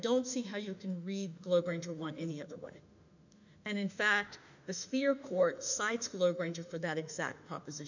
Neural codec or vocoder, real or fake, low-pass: autoencoder, 48 kHz, 32 numbers a frame, DAC-VAE, trained on Japanese speech; fake; 7.2 kHz